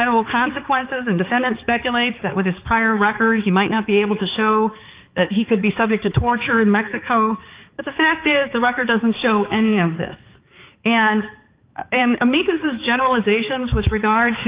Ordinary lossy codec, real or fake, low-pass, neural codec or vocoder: Opus, 32 kbps; fake; 3.6 kHz; codec, 16 kHz, 4 kbps, X-Codec, HuBERT features, trained on balanced general audio